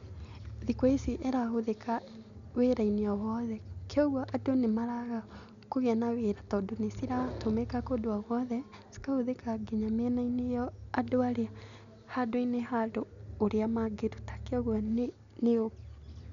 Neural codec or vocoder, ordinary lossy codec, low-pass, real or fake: none; none; 7.2 kHz; real